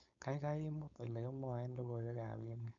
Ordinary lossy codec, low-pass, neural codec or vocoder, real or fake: none; 7.2 kHz; codec, 16 kHz, 4.8 kbps, FACodec; fake